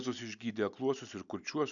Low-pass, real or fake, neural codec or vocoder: 7.2 kHz; real; none